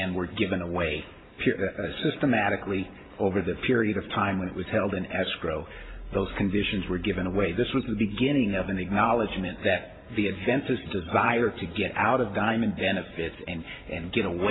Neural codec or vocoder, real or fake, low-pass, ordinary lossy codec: vocoder, 44.1 kHz, 128 mel bands every 512 samples, BigVGAN v2; fake; 7.2 kHz; AAC, 16 kbps